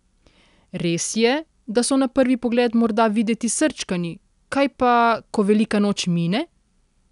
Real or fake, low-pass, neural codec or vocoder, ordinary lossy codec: real; 10.8 kHz; none; none